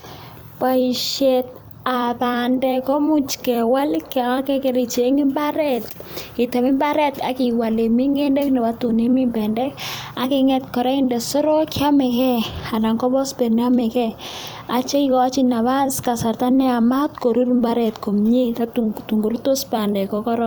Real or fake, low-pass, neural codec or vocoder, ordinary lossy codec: fake; none; vocoder, 44.1 kHz, 128 mel bands every 256 samples, BigVGAN v2; none